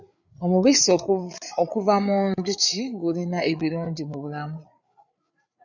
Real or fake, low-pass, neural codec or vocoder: fake; 7.2 kHz; codec, 16 kHz, 8 kbps, FreqCodec, larger model